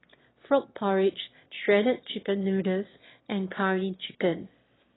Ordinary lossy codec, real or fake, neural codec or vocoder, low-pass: AAC, 16 kbps; fake; autoencoder, 22.05 kHz, a latent of 192 numbers a frame, VITS, trained on one speaker; 7.2 kHz